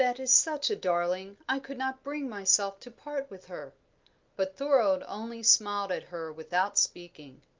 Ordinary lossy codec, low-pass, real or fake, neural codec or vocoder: Opus, 24 kbps; 7.2 kHz; real; none